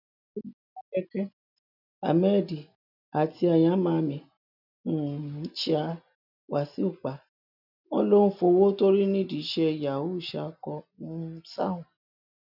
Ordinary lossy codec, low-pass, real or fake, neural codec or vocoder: none; 5.4 kHz; real; none